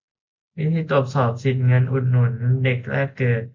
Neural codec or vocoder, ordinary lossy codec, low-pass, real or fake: none; MP3, 64 kbps; 7.2 kHz; real